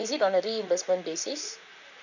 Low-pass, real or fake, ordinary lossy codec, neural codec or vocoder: 7.2 kHz; fake; none; vocoder, 44.1 kHz, 128 mel bands, Pupu-Vocoder